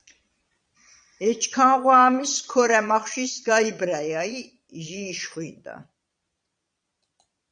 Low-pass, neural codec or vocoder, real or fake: 9.9 kHz; vocoder, 22.05 kHz, 80 mel bands, Vocos; fake